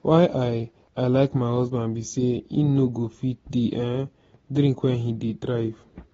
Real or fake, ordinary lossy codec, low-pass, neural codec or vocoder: real; AAC, 24 kbps; 19.8 kHz; none